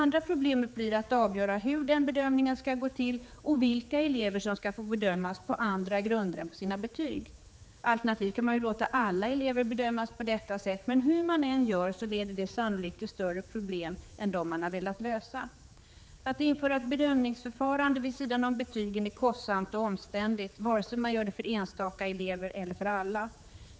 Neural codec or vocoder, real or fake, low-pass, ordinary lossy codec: codec, 16 kHz, 4 kbps, X-Codec, HuBERT features, trained on general audio; fake; none; none